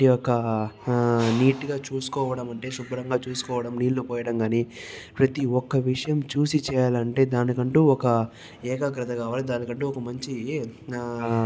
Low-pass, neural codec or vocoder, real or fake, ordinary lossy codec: none; none; real; none